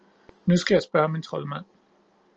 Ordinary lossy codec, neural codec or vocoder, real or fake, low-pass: Opus, 24 kbps; none; real; 7.2 kHz